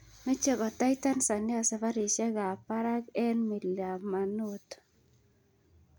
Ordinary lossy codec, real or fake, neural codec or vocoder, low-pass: none; real; none; none